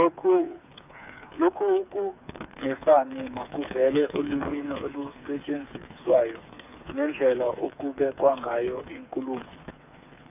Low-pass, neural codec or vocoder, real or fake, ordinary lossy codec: 3.6 kHz; codec, 16 kHz, 4 kbps, FreqCodec, smaller model; fake; none